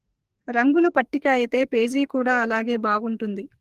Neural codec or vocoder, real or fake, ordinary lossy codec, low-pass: codec, 44.1 kHz, 2.6 kbps, SNAC; fake; Opus, 24 kbps; 14.4 kHz